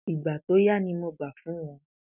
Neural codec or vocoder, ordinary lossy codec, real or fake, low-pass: none; none; real; 3.6 kHz